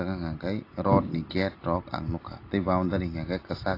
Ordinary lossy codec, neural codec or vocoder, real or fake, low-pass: AAC, 32 kbps; none; real; 5.4 kHz